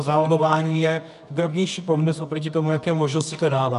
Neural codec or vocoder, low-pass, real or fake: codec, 24 kHz, 0.9 kbps, WavTokenizer, medium music audio release; 10.8 kHz; fake